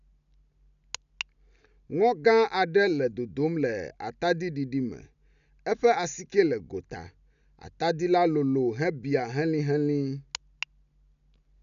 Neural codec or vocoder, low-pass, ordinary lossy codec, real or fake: none; 7.2 kHz; none; real